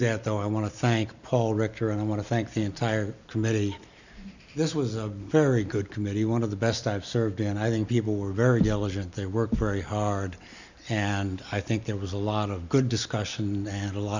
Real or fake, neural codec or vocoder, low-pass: real; none; 7.2 kHz